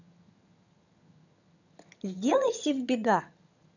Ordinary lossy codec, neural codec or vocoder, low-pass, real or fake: none; vocoder, 22.05 kHz, 80 mel bands, HiFi-GAN; 7.2 kHz; fake